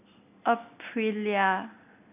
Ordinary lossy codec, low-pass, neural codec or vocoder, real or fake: none; 3.6 kHz; none; real